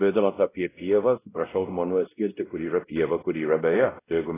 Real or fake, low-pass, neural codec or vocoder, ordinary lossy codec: fake; 3.6 kHz; codec, 16 kHz, 0.5 kbps, X-Codec, WavLM features, trained on Multilingual LibriSpeech; AAC, 16 kbps